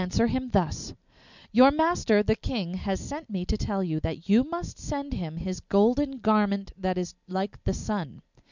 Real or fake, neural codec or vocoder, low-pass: real; none; 7.2 kHz